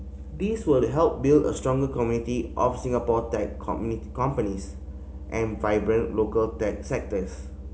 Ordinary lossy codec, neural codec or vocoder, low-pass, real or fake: none; none; none; real